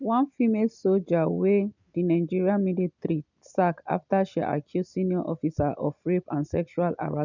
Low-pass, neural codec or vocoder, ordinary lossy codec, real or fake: 7.2 kHz; none; none; real